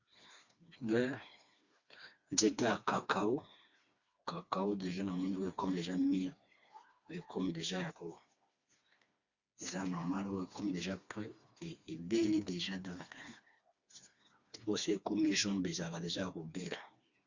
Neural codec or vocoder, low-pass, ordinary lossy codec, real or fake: codec, 16 kHz, 2 kbps, FreqCodec, smaller model; 7.2 kHz; Opus, 64 kbps; fake